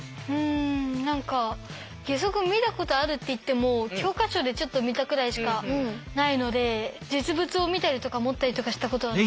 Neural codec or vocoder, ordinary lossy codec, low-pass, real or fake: none; none; none; real